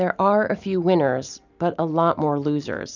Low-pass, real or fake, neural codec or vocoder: 7.2 kHz; fake; vocoder, 44.1 kHz, 128 mel bands every 512 samples, BigVGAN v2